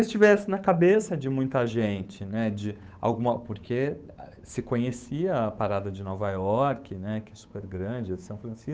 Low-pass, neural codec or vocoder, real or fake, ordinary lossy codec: none; codec, 16 kHz, 8 kbps, FunCodec, trained on Chinese and English, 25 frames a second; fake; none